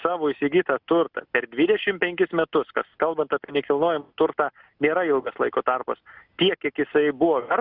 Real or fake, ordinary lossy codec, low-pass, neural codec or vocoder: real; Opus, 64 kbps; 5.4 kHz; none